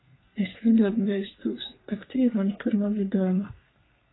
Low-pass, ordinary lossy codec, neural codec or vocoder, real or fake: 7.2 kHz; AAC, 16 kbps; codec, 32 kHz, 1.9 kbps, SNAC; fake